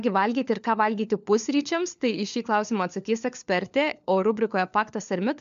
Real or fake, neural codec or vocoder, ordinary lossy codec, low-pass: fake; codec, 16 kHz, 4 kbps, FunCodec, trained on LibriTTS, 50 frames a second; MP3, 64 kbps; 7.2 kHz